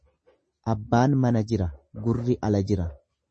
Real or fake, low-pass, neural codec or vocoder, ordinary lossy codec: real; 10.8 kHz; none; MP3, 32 kbps